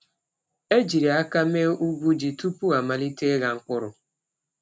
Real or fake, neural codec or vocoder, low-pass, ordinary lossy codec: real; none; none; none